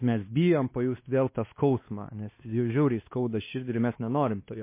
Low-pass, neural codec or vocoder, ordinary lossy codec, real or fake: 3.6 kHz; codec, 16 kHz, 2 kbps, X-Codec, WavLM features, trained on Multilingual LibriSpeech; MP3, 24 kbps; fake